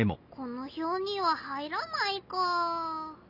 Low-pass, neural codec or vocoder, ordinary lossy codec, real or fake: 5.4 kHz; none; none; real